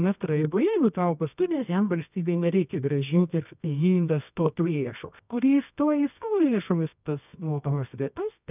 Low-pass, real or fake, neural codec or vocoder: 3.6 kHz; fake; codec, 24 kHz, 0.9 kbps, WavTokenizer, medium music audio release